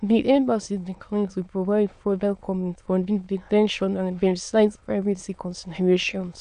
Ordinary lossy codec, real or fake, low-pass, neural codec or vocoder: none; fake; 9.9 kHz; autoencoder, 22.05 kHz, a latent of 192 numbers a frame, VITS, trained on many speakers